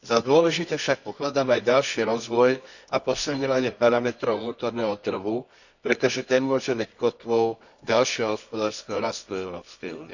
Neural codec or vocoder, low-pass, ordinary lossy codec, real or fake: codec, 24 kHz, 0.9 kbps, WavTokenizer, medium music audio release; 7.2 kHz; none; fake